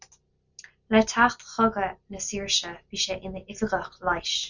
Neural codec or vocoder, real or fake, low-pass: none; real; 7.2 kHz